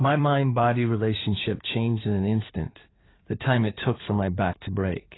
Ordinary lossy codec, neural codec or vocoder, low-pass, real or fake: AAC, 16 kbps; codec, 16 kHz in and 24 kHz out, 2.2 kbps, FireRedTTS-2 codec; 7.2 kHz; fake